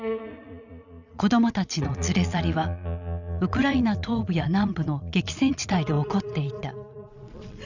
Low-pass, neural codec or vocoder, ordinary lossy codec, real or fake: 7.2 kHz; vocoder, 22.05 kHz, 80 mel bands, Vocos; none; fake